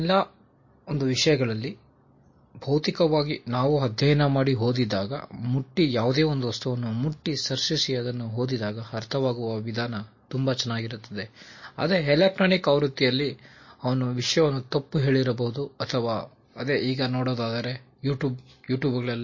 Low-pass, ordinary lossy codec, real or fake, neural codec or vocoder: 7.2 kHz; MP3, 32 kbps; real; none